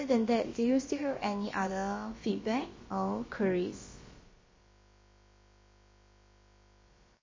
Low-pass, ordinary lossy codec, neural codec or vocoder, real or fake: 7.2 kHz; MP3, 32 kbps; codec, 16 kHz, about 1 kbps, DyCAST, with the encoder's durations; fake